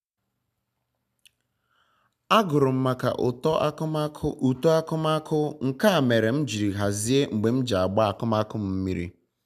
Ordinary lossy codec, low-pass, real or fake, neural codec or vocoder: none; 14.4 kHz; real; none